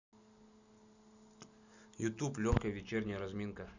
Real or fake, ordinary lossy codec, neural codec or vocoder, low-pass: real; none; none; 7.2 kHz